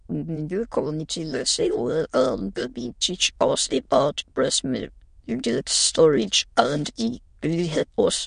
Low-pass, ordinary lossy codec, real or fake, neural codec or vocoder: 9.9 kHz; MP3, 48 kbps; fake; autoencoder, 22.05 kHz, a latent of 192 numbers a frame, VITS, trained on many speakers